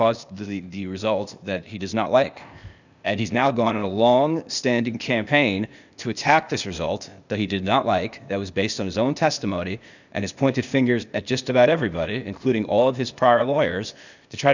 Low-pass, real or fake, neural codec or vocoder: 7.2 kHz; fake; codec, 16 kHz, 0.8 kbps, ZipCodec